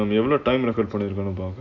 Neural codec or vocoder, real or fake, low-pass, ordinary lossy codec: none; real; 7.2 kHz; none